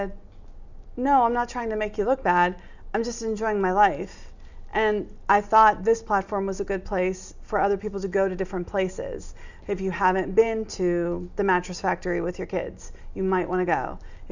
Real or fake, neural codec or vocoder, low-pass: real; none; 7.2 kHz